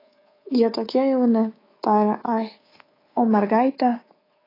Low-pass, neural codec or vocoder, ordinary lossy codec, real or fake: 5.4 kHz; codec, 24 kHz, 3.1 kbps, DualCodec; AAC, 24 kbps; fake